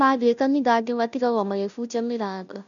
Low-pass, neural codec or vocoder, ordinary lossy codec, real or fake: 7.2 kHz; codec, 16 kHz, 0.5 kbps, FunCodec, trained on Chinese and English, 25 frames a second; none; fake